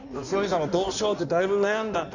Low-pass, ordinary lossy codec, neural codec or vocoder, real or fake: 7.2 kHz; none; codec, 16 kHz in and 24 kHz out, 1.1 kbps, FireRedTTS-2 codec; fake